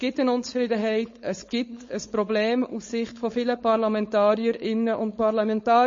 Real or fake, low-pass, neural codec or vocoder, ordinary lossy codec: fake; 7.2 kHz; codec, 16 kHz, 4.8 kbps, FACodec; MP3, 32 kbps